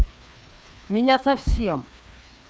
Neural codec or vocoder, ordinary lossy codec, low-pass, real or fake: codec, 16 kHz, 2 kbps, FreqCodec, larger model; none; none; fake